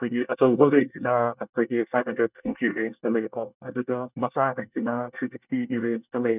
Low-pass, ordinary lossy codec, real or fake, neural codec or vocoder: 3.6 kHz; none; fake; codec, 24 kHz, 1 kbps, SNAC